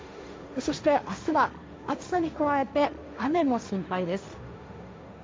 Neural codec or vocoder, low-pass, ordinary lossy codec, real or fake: codec, 16 kHz, 1.1 kbps, Voila-Tokenizer; none; none; fake